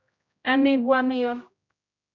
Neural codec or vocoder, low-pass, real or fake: codec, 16 kHz, 0.5 kbps, X-Codec, HuBERT features, trained on general audio; 7.2 kHz; fake